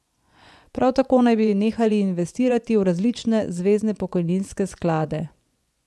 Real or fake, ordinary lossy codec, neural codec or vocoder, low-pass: fake; none; vocoder, 24 kHz, 100 mel bands, Vocos; none